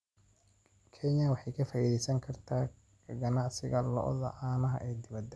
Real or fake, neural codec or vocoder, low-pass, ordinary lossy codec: real; none; none; none